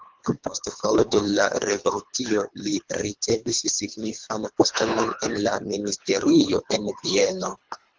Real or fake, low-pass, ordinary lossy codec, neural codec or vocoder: fake; 7.2 kHz; Opus, 24 kbps; codec, 24 kHz, 3 kbps, HILCodec